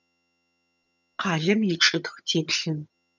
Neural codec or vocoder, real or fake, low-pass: vocoder, 22.05 kHz, 80 mel bands, HiFi-GAN; fake; 7.2 kHz